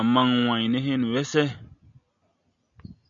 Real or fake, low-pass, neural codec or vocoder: real; 7.2 kHz; none